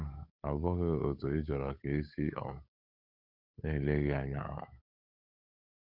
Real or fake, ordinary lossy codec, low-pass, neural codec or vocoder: fake; none; 5.4 kHz; codec, 16 kHz, 8 kbps, FunCodec, trained on Chinese and English, 25 frames a second